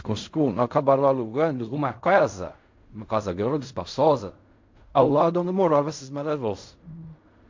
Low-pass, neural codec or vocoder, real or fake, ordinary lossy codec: 7.2 kHz; codec, 16 kHz in and 24 kHz out, 0.4 kbps, LongCat-Audio-Codec, fine tuned four codebook decoder; fake; MP3, 48 kbps